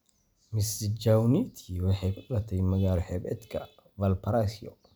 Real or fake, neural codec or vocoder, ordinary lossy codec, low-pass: real; none; none; none